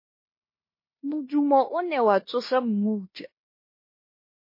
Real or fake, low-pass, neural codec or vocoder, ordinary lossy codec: fake; 5.4 kHz; codec, 16 kHz in and 24 kHz out, 0.9 kbps, LongCat-Audio-Codec, fine tuned four codebook decoder; MP3, 24 kbps